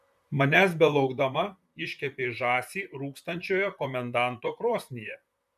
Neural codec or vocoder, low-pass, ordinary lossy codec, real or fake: vocoder, 44.1 kHz, 128 mel bands, Pupu-Vocoder; 14.4 kHz; MP3, 96 kbps; fake